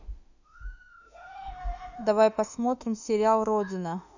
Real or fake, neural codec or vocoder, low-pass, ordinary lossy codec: fake; autoencoder, 48 kHz, 32 numbers a frame, DAC-VAE, trained on Japanese speech; 7.2 kHz; none